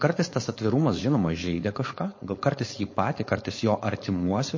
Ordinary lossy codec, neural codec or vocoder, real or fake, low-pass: MP3, 32 kbps; codec, 16 kHz, 4.8 kbps, FACodec; fake; 7.2 kHz